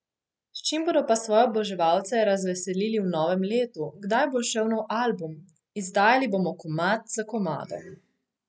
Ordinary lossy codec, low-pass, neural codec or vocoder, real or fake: none; none; none; real